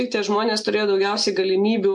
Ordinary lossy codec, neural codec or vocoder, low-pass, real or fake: MP3, 64 kbps; none; 10.8 kHz; real